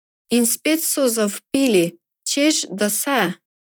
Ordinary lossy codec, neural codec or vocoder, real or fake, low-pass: none; vocoder, 44.1 kHz, 128 mel bands, Pupu-Vocoder; fake; none